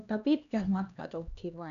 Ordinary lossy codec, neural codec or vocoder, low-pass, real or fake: none; codec, 16 kHz, 2 kbps, X-Codec, HuBERT features, trained on LibriSpeech; 7.2 kHz; fake